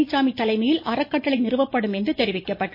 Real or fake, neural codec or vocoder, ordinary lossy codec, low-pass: real; none; MP3, 24 kbps; 5.4 kHz